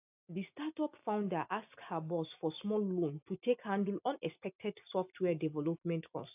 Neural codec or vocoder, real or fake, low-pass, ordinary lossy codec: none; real; 3.6 kHz; AAC, 32 kbps